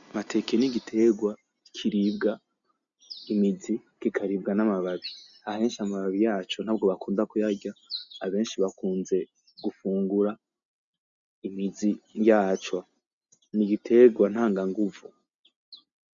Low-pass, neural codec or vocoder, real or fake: 7.2 kHz; none; real